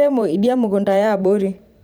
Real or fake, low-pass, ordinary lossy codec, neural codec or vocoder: fake; none; none; vocoder, 44.1 kHz, 128 mel bands every 512 samples, BigVGAN v2